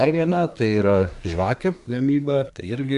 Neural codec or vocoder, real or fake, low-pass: codec, 24 kHz, 1 kbps, SNAC; fake; 10.8 kHz